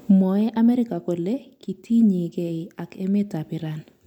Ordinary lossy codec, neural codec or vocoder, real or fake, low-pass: MP3, 96 kbps; none; real; 19.8 kHz